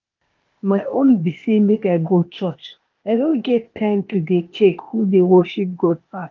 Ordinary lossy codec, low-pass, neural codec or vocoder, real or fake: Opus, 24 kbps; 7.2 kHz; codec, 16 kHz, 0.8 kbps, ZipCodec; fake